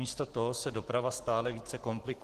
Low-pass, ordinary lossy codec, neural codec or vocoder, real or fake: 14.4 kHz; Opus, 16 kbps; codec, 44.1 kHz, 7.8 kbps, Pupu-Codec; fake